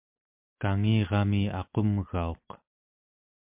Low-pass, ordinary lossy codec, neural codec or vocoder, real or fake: 3.6 kHz; MP3, 32 kbps; none; real